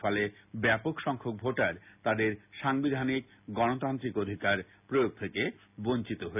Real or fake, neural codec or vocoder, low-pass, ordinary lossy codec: real; none; 3.6 kHz; none